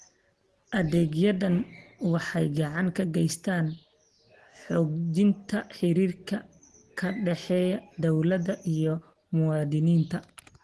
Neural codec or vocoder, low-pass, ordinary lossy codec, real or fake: none; 10.8 kHz; Opus, 16 kbps; real